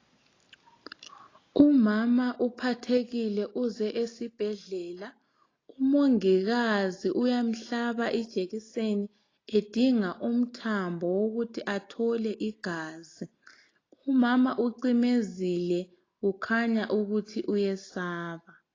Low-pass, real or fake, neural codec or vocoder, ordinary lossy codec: 7.2 kHz; real; none; AAC, 32 kbps